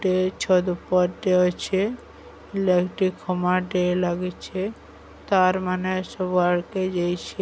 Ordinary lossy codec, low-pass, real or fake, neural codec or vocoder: none; none; real; none